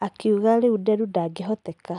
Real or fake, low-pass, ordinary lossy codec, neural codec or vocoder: real; 10.8 kHz; none; none